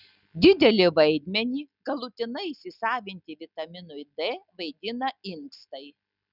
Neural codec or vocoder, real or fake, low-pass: none; real; 5.4 kHz